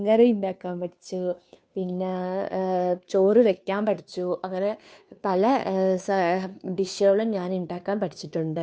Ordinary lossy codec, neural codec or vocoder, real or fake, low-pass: none; codec, 16 kHz, 2 kbps, FunCodec, trained on Chinese and English, 25 frames a second; fake; none